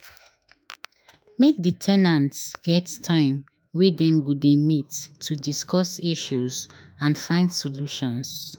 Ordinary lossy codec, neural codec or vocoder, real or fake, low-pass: none; autoencoder, 48 kHz, 32 numbers a frame, DAC-VAE, trained on Japanese speech; fake; none